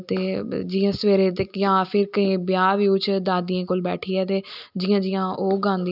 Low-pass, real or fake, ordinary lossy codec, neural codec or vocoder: 5.4 kHz; real; none; none